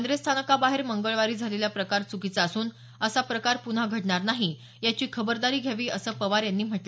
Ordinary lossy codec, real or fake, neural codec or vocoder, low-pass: none; real; none; none